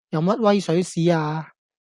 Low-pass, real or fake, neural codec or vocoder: 10.8 kHz; real; none